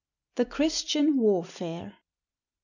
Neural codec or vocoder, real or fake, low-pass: none; real; 7.2 kHz